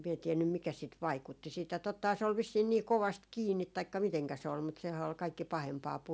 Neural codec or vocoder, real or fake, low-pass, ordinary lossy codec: none; real; none; none